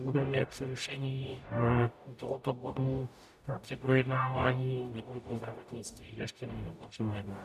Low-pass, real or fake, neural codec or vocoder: 14.4 kHz; fake; codec, 44.1 kHz, 0.9 kbps, DAC